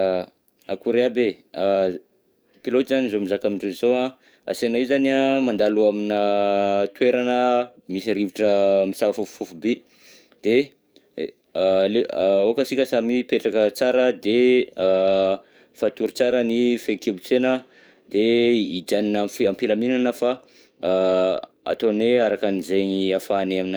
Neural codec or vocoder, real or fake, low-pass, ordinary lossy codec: codec, 44.1 kHz, 7.8 kbps, DAC; fake; none; none